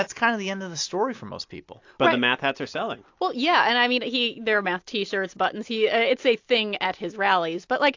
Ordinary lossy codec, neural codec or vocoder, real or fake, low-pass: AAC, 48 kbps; none; real; 7.2 kHz